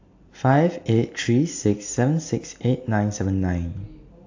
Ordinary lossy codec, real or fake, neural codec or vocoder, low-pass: AAC, 48 kbps; real; none; 7.2 kHz